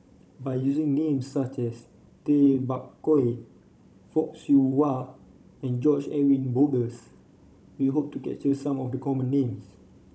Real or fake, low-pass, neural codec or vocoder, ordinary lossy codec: fake; none; codec, 16 kHz, 16 kbps, FunCodec, trained on Chinese and English, 50 frames a second; none